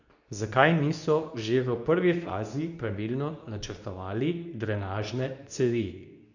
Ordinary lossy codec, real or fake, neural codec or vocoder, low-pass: none; fake; codec, 24 kHz, 0.9 kbps, WavTokenizer, medium speech release version 2; 7.2 kHz